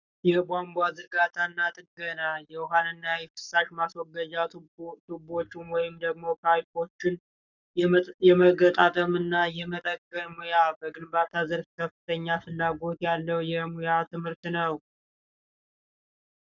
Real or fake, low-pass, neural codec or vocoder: fake; 7.2 kHz; codec, 44.1 kHz, 7.8 kbps, Pupu-Codec